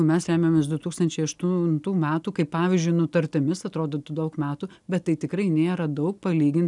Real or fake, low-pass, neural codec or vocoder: real; 10.8 kHz; none